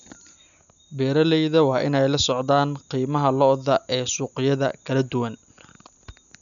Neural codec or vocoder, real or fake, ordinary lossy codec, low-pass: none; real; none; 7.2 kHz